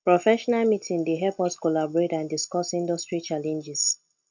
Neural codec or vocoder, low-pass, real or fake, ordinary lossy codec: none; 7.2 kHz; real; none